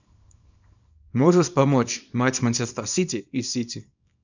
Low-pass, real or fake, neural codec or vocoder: 7.2 kHz; fake; codec, 24 kHz, 0.9 kbps, WavTokenizer, small release